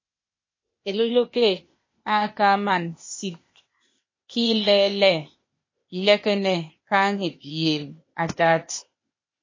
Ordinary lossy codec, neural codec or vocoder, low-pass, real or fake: MP3, 32 kbps; codec, 16 kHz, 0.8 kbps, ZipCodec; 7.2 kHz; fake